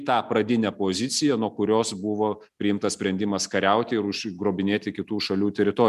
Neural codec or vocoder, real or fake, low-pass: none; real; 14.4 kHz